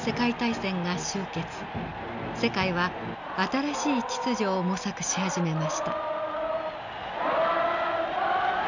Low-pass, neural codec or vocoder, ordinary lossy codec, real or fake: 7.2 kHz; none; none; real